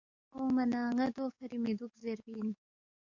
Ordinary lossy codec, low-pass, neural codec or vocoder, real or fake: MP3, 48 kbps; 7.2 kHz; codec, 44.1 kHz, 7.8 kbps, DAC; fake